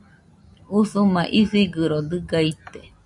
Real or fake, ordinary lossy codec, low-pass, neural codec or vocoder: real; MP3, 96 kbps; 10.8 kHz; none